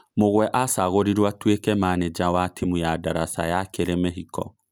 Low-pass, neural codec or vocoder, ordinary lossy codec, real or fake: none; none; none; real